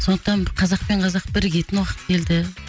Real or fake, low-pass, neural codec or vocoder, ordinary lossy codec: real; none; none; none